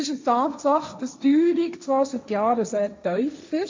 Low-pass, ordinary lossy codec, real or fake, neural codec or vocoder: 7.2 kHz; MP3, 64 kbps; fake; codec, 16 kHz, 1.1 kbps, Voila-Tokenizer